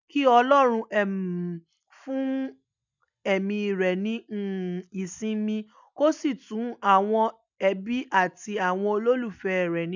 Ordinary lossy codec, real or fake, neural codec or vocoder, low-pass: none; real; none; 7.2 kHz